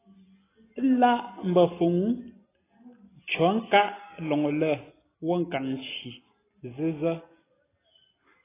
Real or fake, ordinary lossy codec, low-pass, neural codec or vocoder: real; AAC, 16 kbps; 3.6 kHz; none